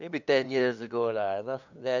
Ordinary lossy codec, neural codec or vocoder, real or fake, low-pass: none; codec, 16 kHz, 2 kbps, FunCodec, trained on LibriTTS, 25 frames a second; fake; 7.2 kHz